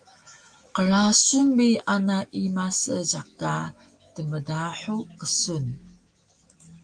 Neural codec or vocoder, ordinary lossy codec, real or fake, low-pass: autoencoder, 48 kHz, 128 numbers a frame, DAC-VAE, trained on Japanese speech; Opus, 32 kbps; fake; 9.9 kHz